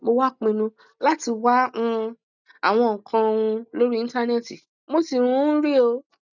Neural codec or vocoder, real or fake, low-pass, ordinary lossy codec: none; real; 7.2 kHz; none